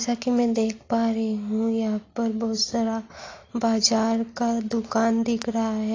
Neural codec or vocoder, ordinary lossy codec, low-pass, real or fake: none; AAC, 32 kbps; 7.2 kHz; real